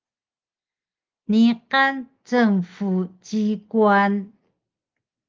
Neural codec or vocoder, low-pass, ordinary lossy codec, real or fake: none; 7.2 kHz; Opus, 24 kbps; real